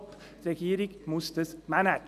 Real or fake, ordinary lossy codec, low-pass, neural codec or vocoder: real; none; 14.4 kHz; none